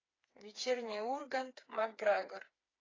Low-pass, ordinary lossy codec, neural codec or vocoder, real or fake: 7.2 kHz; AAC, 32 kbps; codec, 16 kHz, 4 kbps, FreqCodec, smaller model; fake